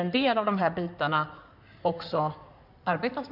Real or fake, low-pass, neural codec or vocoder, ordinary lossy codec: fake; 5.4 kHz; codec, 16 kHz in and 24 kHz out, 2.2 kbps, FireRedTTS-2 codec; none